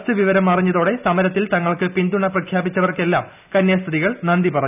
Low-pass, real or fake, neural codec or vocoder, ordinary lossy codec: 3.6 kHz; real; none; none